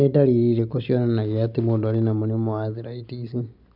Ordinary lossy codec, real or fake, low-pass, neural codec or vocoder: none; real; 5.4 kHz; none